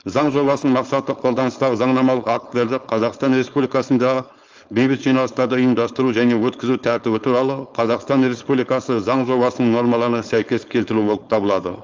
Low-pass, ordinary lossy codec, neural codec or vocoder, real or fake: 7.2 kHz; Opus, 24 kbps; codec, 16 kHz, 4.8 kbps, FACodec; fake